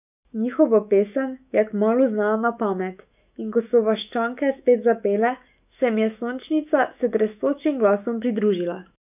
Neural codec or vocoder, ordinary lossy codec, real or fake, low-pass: autoencoder, 48 kHz, 128 numbers a frame, DAC-VAE, trained on Japanese speech; none; fake; 3.6 kHz